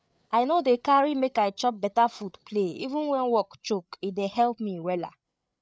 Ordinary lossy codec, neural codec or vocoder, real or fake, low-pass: none; codec, 16 kHz, 8 kbps, FreqCodec, larger model; fake; none